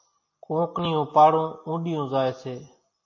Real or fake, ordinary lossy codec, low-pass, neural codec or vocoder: real; MP3, 32 kbps; 7.2 kHz; none